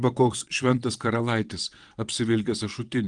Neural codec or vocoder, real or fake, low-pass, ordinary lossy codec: vocoder, 22.05 kHz, 80 mel bands, WaveNeXt; fake; 9.9 kHz; Opus, 24 kbps